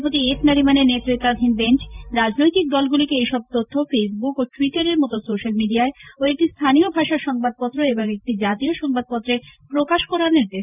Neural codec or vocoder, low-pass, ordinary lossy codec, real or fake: vocoder, 44.1 kHz, 128 mel bands every 512 samples, BigVGAN v2; 3.6 kHz; none; fake